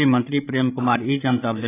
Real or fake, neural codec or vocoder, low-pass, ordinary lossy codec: fake; codec, 16 kHz, 16 kbps, FreqCodec, larger model; 3.6 kHz; AAC, 24 kbps